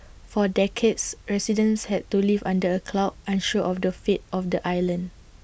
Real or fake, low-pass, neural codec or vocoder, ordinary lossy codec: real; none; none; none